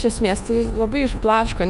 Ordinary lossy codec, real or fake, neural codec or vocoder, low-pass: Opus, 64 kbps; fake; codec, 24 kHz, 1.2 kbps, DualCodec; 10.8 kHz